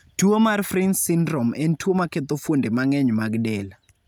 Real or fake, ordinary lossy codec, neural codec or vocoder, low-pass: real; none; none; none